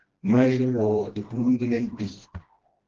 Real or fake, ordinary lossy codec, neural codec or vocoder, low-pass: fake; Opus, 16 kbps; codec, 16 kHz, 1 kbps, FreqCodec, smaller model; 7.2 kHz